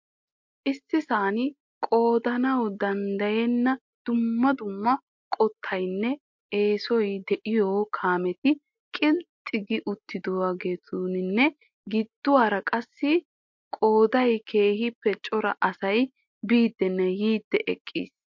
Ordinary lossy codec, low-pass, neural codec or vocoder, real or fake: MP3, 48 kbps; 7.2 kHz; none; real